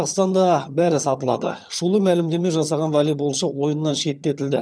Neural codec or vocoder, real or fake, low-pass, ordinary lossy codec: vocoder, 22.05 kHz, 80 mel bands, HiFi-GAN; fake; none; none